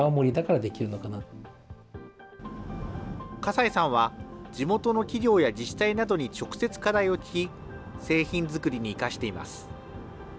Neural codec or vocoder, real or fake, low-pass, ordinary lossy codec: none; real; none; none